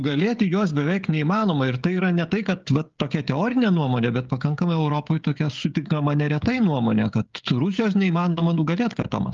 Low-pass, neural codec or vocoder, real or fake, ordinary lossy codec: 7.2 kHz; codec, 16 kHz, 16 kbps, FreqCodec, smaller model; fake; Opus, 32 kbps